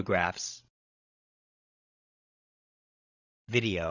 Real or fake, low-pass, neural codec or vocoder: fake; 7.2 kHz; codec, 16 kHz, 16 kbps, FreqCodec, larger model